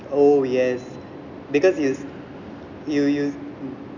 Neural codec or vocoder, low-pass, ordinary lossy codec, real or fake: none; 7.2 kHz; none; real